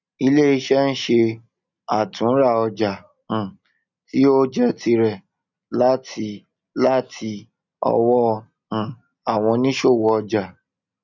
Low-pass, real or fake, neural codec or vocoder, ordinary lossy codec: 7.2 kHz; real; none; none